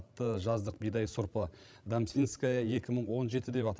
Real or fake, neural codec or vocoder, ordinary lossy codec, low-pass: fake; codec, 16 kHz, 16 kbps, FreqCodec, larger model; none; none